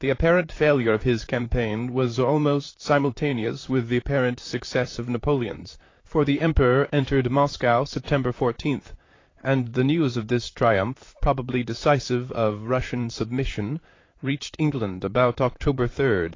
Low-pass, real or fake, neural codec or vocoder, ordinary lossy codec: 7.2 kHz; fake; vocoder, 44.1 kHz, 128 mel bands, Pupu-Vocoder; AAC, 32 kbps